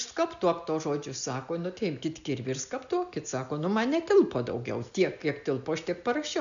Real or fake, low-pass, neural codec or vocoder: real; 7.2 kHz; none